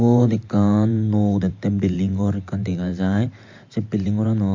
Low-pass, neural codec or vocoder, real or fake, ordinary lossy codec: 7.2 kHz; vocoder, 44.1 kHz, 128 mel bands every 512 samples, BigVGAN v2; fake; MP3, 48 kbps